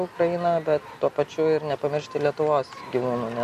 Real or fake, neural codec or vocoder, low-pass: real; none; 14.4 kHz